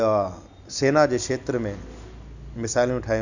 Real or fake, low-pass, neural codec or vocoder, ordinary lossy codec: real; 7.2 kHz; none; none